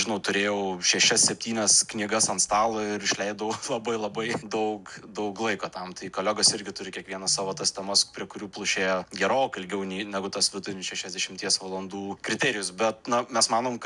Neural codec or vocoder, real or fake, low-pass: none; real; 10.8 kHz